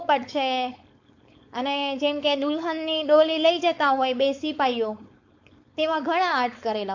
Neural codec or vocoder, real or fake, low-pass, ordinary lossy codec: codec, 16 kHz, 4.8 kbps, FACodec; fake; 7.2 kHz; AAC, 48 kbps